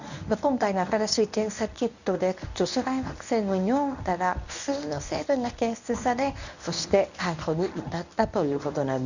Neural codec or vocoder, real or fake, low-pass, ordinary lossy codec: codec, 24 kHz, 0.9 kbps, WavTokenizer, medium speech release version 1; fake; 7.2 kHz; none